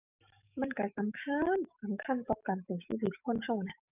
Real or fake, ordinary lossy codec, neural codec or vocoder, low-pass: fake; none; codec, 44.1 kHz, 7.8 kbps, DAC; 3.6 kHz